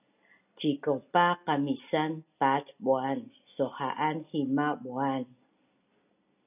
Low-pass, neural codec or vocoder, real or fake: 3.6 kHz; none; real